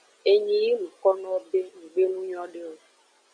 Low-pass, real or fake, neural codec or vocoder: 9.9 kHz; real; none